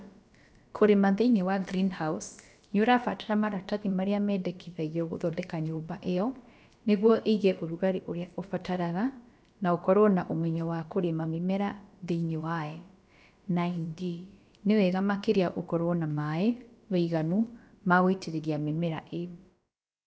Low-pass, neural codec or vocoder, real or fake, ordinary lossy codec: none; codec, 16 kHz, about 1 kbps, DyCAST, with the encoder's durations; fake; none